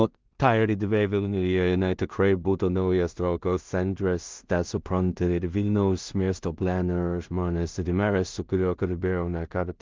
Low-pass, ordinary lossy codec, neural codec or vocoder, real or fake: 7.2 kHz; Opus, 32 kbps; codec, 16 kHz in and 24 kHz out, 0.4 kbps, LongCat-Audio-Codec, two codebook decoder; fake